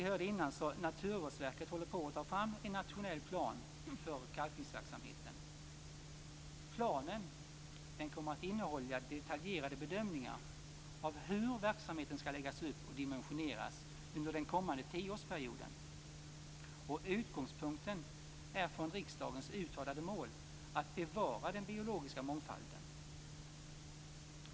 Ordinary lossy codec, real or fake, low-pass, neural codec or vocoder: none; real; none; none